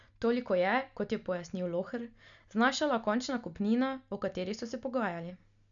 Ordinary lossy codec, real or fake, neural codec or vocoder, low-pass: none; real; none; 7.2 kHz